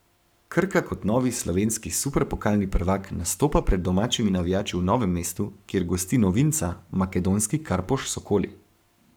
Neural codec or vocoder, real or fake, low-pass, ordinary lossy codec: codec, 44.1 kHz, 7.8 kbps, Pupu-Codec; fake; none; none